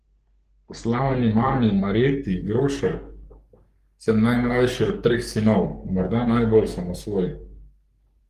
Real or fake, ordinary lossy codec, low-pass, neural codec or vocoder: fake; Opus, 24 kbps; 14.4 kHz; codec, 44.1 kHz, 3.4 kbps, Pupu-Codec